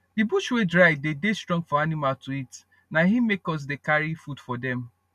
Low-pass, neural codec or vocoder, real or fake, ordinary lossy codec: 14.4 kHz; none; real; none